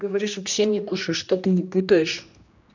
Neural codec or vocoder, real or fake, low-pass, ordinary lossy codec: codec, 16 kHz, 1 kbps, X-Codec, HuBERT features, trained on general audio; fake; 7.2 kHz; none